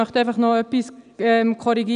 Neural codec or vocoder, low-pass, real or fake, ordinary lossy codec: none; 9.9 kHz; real; none